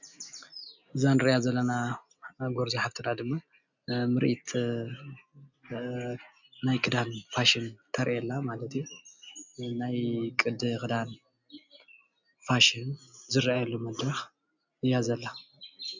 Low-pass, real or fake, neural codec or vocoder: 7.2 kHz; real; none